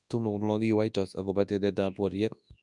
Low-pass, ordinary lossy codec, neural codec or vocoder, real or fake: 10.8 kHz; none; codec, 24 kHz, 0.9 kbps, WavTokenizer, large speech release; fake